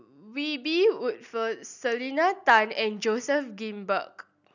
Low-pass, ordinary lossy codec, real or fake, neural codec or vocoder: 7.2 kHz; none; real; none